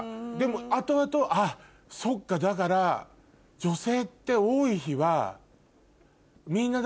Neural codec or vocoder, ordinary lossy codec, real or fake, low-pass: none; none; real; none